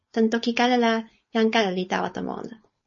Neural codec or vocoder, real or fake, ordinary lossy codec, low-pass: codec, 16 kHz, 4.8 kbps, FACodec; fake; MP3, 32 kbps; 7.2 kHz